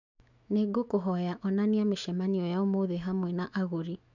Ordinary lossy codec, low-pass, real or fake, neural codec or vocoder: none; 7.2 kHz; fake; autoencoder, 48 kHz, 128 numbers a frame, DAC-VAE, trained on Japanese speech